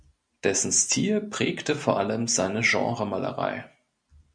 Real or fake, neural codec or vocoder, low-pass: real; none; 9.9 kHz